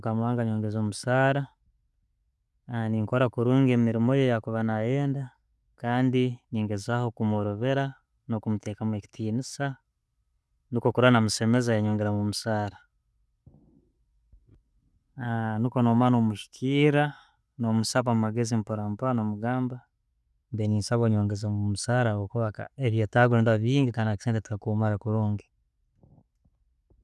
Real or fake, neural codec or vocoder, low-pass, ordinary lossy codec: real; none; none; none